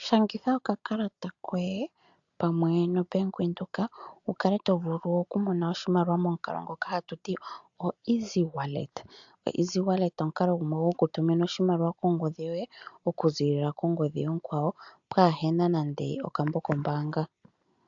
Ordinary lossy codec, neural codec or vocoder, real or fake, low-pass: AAC, 64 kbps; none; real; 7.2 kHz